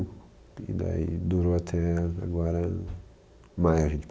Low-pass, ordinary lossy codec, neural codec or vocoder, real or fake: none; none; none; real